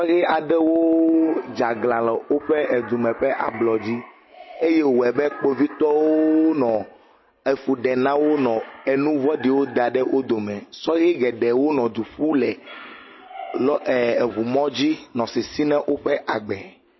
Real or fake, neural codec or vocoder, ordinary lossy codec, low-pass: real; none; MP3, 24 kbps; 7.2 kHz